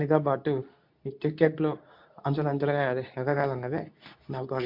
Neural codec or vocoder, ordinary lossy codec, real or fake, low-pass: codec, 24 kHz, 0.9 kbps, WavTokenizer, medium speech release version 2; MP3, 48 kbps; fake; 5.4 kHz